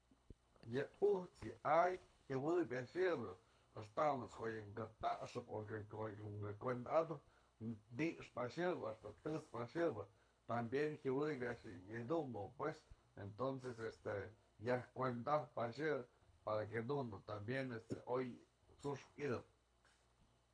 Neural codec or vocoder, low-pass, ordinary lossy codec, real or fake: codec, 24 kHz, 6 kbps, HILCodec; 9.9 kHz; none; fake